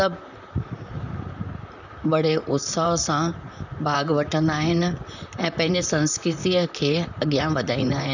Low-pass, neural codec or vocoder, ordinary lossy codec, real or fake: 7.2 kHz; vocoder, 44.1 kHz, 128 mel bands, Pupu-Vocoder; none; fake